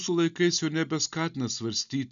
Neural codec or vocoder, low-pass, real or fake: none; 7.2 kHz; real